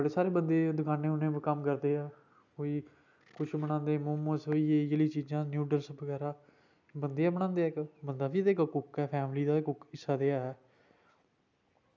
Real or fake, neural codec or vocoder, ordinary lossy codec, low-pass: real; none; none; 7.2 kHz